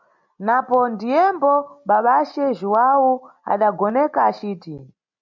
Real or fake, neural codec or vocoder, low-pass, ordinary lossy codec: real; none; 7.2 kHz; MP3, 64 kbps